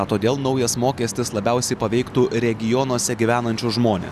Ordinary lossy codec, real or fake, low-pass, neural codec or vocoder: Opus, 64 kbps; real; 14.4 kHz; none